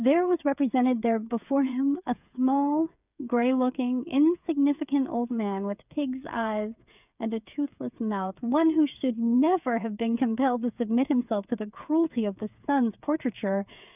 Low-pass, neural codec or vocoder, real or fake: 3.6 kHz; codec, 16 kHz, 8 kbps, FreqCodec, smaller model; fake